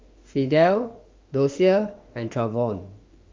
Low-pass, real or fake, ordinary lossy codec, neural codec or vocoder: 7.2 kHz; fake; Opus, 32 kbps; autoencoder, 48 kHz, 32 numbers a frame, DAC-VAE, trained on Japanese speech